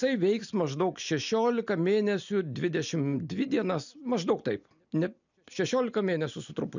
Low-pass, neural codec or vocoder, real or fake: 7.2 kHz; none; real